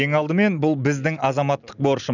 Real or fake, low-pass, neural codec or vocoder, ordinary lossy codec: real; 7.2 kHz; none; none